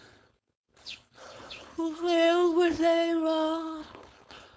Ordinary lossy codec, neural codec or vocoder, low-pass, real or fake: none; codec, 16 kHz, 4.8 kbps, FACodec; none; fake